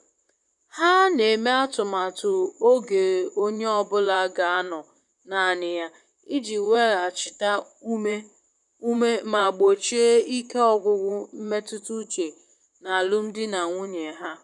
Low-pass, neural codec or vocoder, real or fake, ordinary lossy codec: 10.8 kHz; vocoder, 44.1 kHz, 128 mel bands, Pupu-Vocoder; fake; none